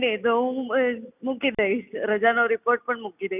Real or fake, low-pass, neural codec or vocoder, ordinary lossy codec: real; 3.6 kHz; none; none